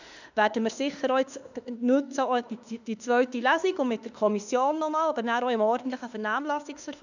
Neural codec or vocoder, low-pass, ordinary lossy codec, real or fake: autoencoder, 48 kHz, 32 numbers a frame, DAC-VAE, trained on Japanese speech; 7.2 kHz; none; fake